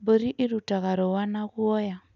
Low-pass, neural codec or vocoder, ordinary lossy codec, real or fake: 7.2 kHz; none; none; real